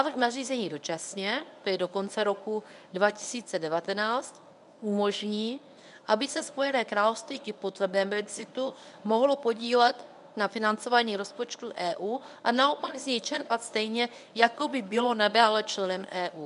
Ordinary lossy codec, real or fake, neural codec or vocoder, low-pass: MP3, 96 kbps; fake; codec, 24 kHz, 0.9 kbps, WavTokenizer, medium speech release version 1; 10.8 kHz